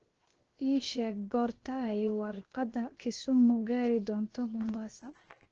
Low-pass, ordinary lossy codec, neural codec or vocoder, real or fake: 7.2 kHz; Opus, 16 kbps; codec, 16 kHz, 0.7 kbps, FocalCodec; fake